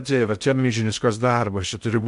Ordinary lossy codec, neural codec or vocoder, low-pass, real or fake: MP3, 64 kbps; codec, 16 kHz in and 24 kHz out, 0.6 kbps, FocalCodec, streaming, 2048 codes; 10.8 kHz; fake